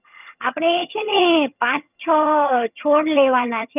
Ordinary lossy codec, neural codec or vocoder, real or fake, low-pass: none; vocoder, 22.05 kHz, 80 mel bands, HiFi-GAN; fake; 3.6 kHz